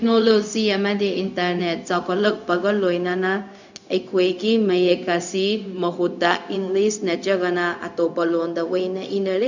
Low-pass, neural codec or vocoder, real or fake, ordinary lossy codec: 7.2 kHz; codec, 16 kHz, 0.4 kbps, LongCat-Audio-Codec; fake; none